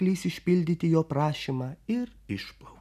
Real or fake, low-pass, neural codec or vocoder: real; 14.4 kHz; none